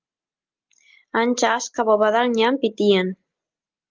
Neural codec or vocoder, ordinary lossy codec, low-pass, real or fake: none; Opus, 24 kbps; 7.2 kHz; real